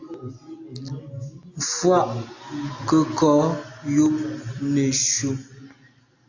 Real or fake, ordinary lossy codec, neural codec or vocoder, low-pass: real; AAC, 48 kbps; none; 7.2 kHz